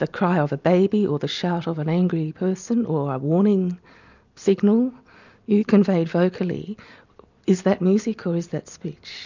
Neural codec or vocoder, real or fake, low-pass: none; real; 7.2 kHz